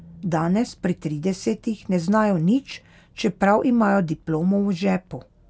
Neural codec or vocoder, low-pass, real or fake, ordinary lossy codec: none; none; real; none